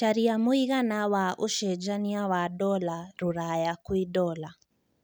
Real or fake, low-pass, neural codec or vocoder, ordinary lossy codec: real; none; none; none